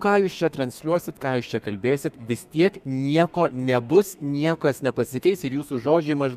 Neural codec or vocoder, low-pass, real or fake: codec, 32 kHz, 1.9 kbps, SNAC; 14.4 kHz; fake